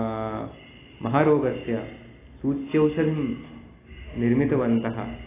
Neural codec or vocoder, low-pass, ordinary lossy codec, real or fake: none; 3.6 kHz; AAC, 16 kbps; real